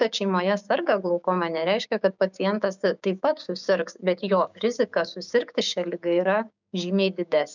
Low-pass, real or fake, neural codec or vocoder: 7.2 kHz; fake; codec, 16 kHz, 6 kbps, DAC